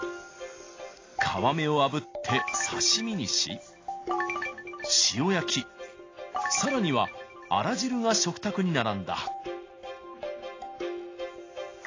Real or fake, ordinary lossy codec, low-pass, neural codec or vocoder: real; AAC, 32 kbps; 7.2 kHz; none